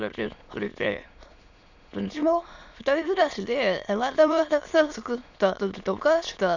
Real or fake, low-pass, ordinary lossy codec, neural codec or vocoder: fake; 7.2 kHz; none; autoencoder, 22.05 kHz, a latent of 192 numbers a frame, VITS, trained on many speakers